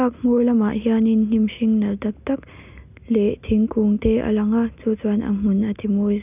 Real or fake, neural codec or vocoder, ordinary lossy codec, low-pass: real; none; none; 3.6 kHz